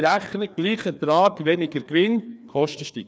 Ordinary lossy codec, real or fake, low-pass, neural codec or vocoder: none; fake; none; codec, 16 kHz, 2 kbps, FreqCodec, larger model